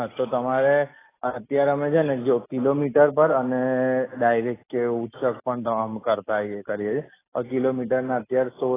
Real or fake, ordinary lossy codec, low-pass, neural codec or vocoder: real; AAC, 16 kbps; 3.6 kHz; none